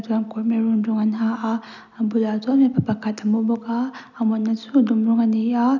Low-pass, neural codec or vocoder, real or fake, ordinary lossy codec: 7.2 kHz; none; real; none